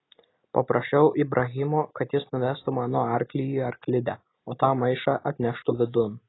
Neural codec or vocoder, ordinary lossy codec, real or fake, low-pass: none; AAC, 16 kbps; real; 7.2 kHz